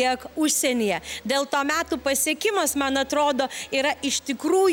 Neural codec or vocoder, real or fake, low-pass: none; real; 19.8 kHz